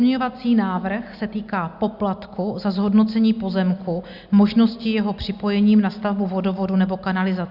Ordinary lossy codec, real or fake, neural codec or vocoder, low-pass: Opus, 64 kbps; real; none; 5.4 kHz